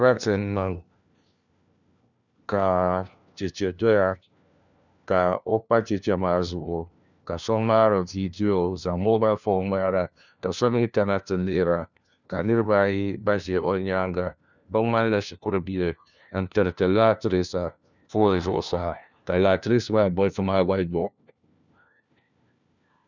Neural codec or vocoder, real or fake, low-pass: codec, 16 kHz, 1 kbps, FunCodec, trained on LibriTTS, 50 frames a second; fake; 7.2 kHz